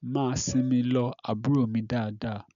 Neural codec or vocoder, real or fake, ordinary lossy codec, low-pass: none; real; none; 7.2 kHz